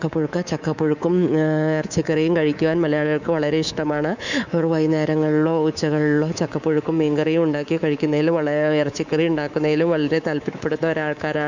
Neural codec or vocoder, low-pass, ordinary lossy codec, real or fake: codec, 24 kHz, 3.1 kbps, DualCodec; 7.2 kHz; none; fake